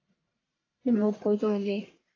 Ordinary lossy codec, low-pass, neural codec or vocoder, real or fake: AAC, 32 kbps; 7.2 kHz; codec, 44.1 kHz, 1.7 kbps, Pupu-Codec; fake